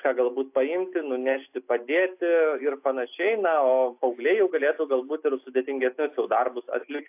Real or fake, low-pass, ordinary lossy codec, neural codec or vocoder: real; 3.6 kHz; AAC, 32 kbps; none